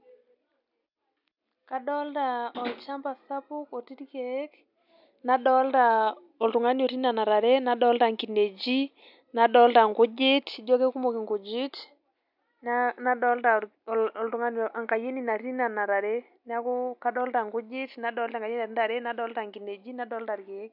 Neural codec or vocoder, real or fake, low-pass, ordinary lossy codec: none; real; 5.4 kHz; none